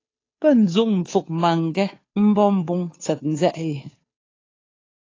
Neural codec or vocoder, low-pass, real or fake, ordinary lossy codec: codec, 16 kHz, 2 kbps, FunCodec, trained on Chinese and English, 25 frames a second; 7.2 kHz; fake; AAC, 32 kbps